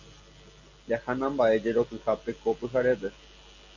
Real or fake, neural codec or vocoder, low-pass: real; none; 7.2 kHz